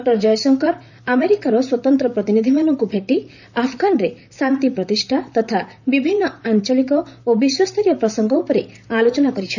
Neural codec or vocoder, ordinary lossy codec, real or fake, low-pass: vocoder, 44.1 kHz, 128 mel bands, Pupu-Vocoder; none; fake; 7.2 kHz